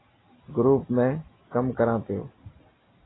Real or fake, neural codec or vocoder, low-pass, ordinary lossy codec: real; none; 7.2 kHz; AAC, 16 kbps